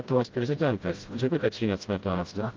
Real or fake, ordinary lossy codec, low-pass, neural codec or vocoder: fake; Opus, 32 kbps; 7.2 kHz; codec, 16 kHz, 0.5 kbps, FreqCodec, smaller model